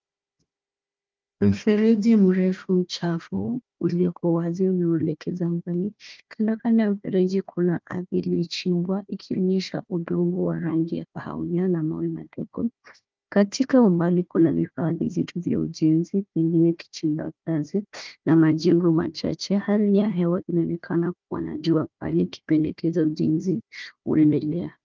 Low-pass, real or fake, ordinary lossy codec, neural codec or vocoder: 7.2 kHz; fake; Opus, 32 kbps; codec, 16 kHz, 1 kbps, FunCodec, trained on Chinese and English, 50 frames a second